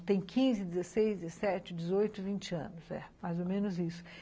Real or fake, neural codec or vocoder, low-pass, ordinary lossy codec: real; none; none; none